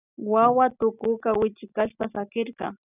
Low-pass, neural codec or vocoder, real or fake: 3.6 kHz; none; real